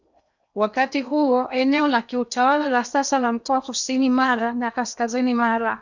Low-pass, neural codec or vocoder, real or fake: 7.2 kHz; codec, 16 kHz in and 24 kHz out, 0.8 kbps, FocalCodec, streaming, 65536 codes; fake